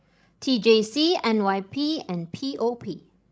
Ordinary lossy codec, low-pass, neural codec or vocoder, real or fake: none; none; codec, 16 kHz, 16 kbps, FreqCodec, larger model; fake